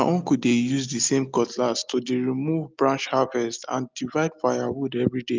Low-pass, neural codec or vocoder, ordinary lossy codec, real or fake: 7.2 kHz; none; Opus, 24 kbps; real